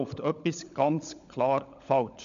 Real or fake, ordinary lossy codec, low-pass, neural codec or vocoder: fake; MP3, 64 kbps; 7.2 kHz; codec, 16 kHz, 16 kbps, FunCodec, trained on LibriTTS, 50 frames a second